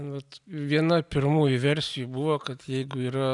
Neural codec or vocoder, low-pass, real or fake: none; 10.8 kHz; real